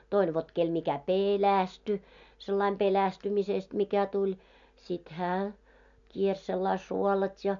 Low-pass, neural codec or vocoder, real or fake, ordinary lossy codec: 7.2 kHz; none; real; MP3, 64 kbps